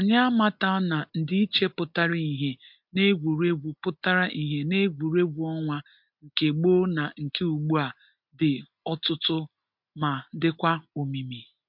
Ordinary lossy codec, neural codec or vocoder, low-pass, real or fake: MP3, 48 kbps; none; 5.4 kHz; real